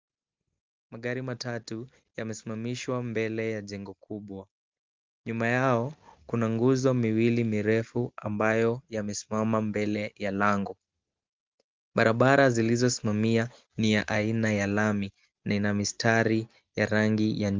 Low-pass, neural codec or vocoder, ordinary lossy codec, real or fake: 7.2 kHz; none; Opus, 32 kbps; real